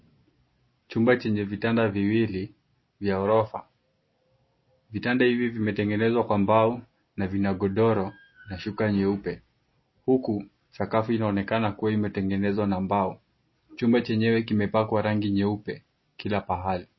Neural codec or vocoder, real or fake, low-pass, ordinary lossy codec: none; real; 7.2 kHz; MP3, 24 kbps